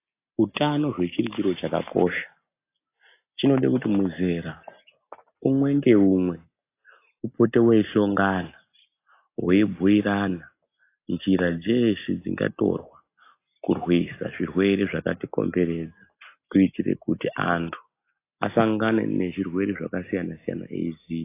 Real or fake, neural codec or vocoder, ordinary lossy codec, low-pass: real; none; AAC, 24 kbps; 3.6 kHz